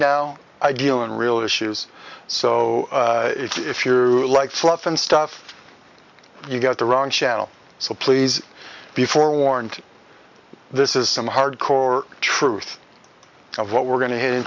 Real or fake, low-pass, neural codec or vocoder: real; 7.2 kHz; none